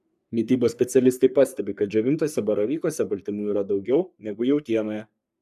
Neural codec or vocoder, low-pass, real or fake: codec, 44.1 kHz, 3.4 kbps, Pupu-Codec; 14.4 kHz; fake